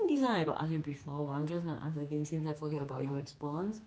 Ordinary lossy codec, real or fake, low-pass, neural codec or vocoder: none; fake; none; codec, 16 kHz, 2 kbps, X-Codec, HuBERT features, trained on general audio